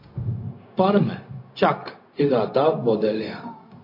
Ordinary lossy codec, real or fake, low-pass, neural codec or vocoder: MP3, 32 kbps; fake; 5.4 kHz; codec, 16 kHz, 0.4 kbps, LongCat-Audio-Codec